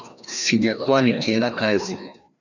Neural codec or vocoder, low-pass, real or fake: codec, 16 kHz, 1 kbps, FreqCodec, larger model; 7.2 kHz; fake